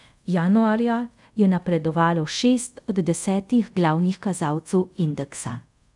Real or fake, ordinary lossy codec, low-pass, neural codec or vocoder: fake; none; 10.8 kHz; codec, 24 kHz, 0.5 kbps, DualCodec